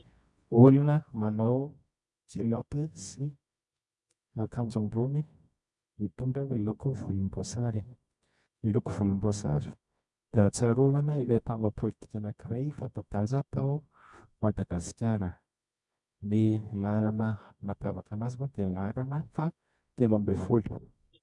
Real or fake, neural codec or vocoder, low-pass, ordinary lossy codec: fake; codec, 24 kHz, 0.9 kbps, WavTokenizer, medium music audio release; 10.8 kHz; MP3, 96 kbps